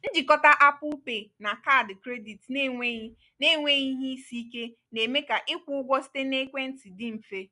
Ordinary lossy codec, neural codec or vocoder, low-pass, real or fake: none; none; 10.8 kHz; real